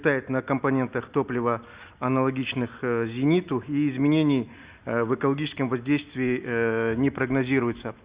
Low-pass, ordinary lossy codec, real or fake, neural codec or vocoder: 3.6 kHz; Opus, 64 kbps; real; none